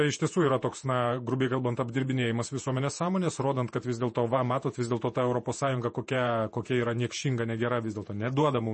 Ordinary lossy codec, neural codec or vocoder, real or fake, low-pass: MP3, 32 kbps; vocoder, 48 kHz, 128 mel bands, Vocos; fake; 10.8 kHz